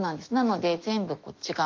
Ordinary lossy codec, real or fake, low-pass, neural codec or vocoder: Opus, 32 kbps; fake; 7.2 kHz; autoencoder, 48 kHz, 128 numbers a frame, DAC-VAE, trained on Japanese speech